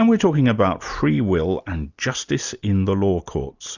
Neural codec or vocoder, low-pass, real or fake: none; 7.2 kHz; real